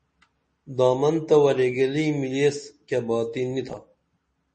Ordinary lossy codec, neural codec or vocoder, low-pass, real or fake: MP3, 32 kbps; none; 10.8 kHz; real